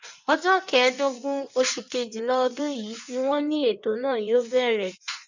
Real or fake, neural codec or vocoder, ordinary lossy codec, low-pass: fake; codec, 16 kHz in and 24 kHz out, 1.1 kbps, FireRedTTS-2 codec; none; 7.2 kHz